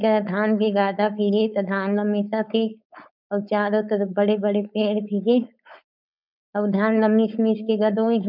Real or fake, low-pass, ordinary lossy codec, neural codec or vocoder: fake; 5.4 kHz; none; codec, 16 kHz, 4.8 kbps, FACodec